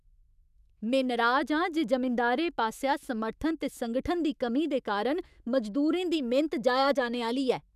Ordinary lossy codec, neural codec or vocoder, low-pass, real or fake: none; autoencoder, 48 kHz, 128 numbers a frame, DAC-VAE, trained on Japanese speech; 14.4 kHz; fake